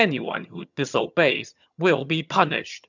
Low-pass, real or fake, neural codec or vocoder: 7.2 kHz; fake; vocoder, 22.05 kHz, 80 mel bands, HiFi-GAN